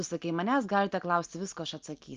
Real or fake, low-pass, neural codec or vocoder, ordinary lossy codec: real; 7.2 kHz; none; Opus, 32 kbps